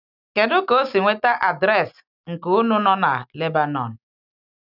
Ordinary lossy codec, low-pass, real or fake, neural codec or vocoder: none; 5.4 kHz; real; none